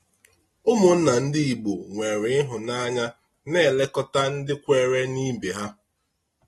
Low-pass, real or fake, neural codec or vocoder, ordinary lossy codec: 19.8 kHz; real; none; AAC, 32 kbps